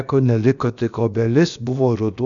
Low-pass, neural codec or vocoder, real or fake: 7.2 kHz; codec, 16 kHz, about 1 kbps, DyCAST, with the encoder's durations; fake